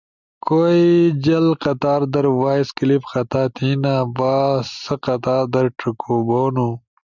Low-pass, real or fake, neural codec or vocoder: 7.2 kHz; real; none